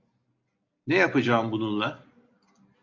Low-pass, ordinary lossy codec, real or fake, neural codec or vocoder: 7.2 kHz; AAC, 48 kbps; real; none